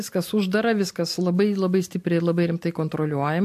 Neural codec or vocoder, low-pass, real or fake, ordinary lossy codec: none; 14.4 kHz; real; MP3, 64 kbps